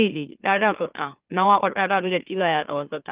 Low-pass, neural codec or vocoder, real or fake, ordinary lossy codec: 3.6 kHz; autoencoder, 44.1 kHz, a latent of 192 numbers a frame, MeloTTS; fake; Opus, 24 kbps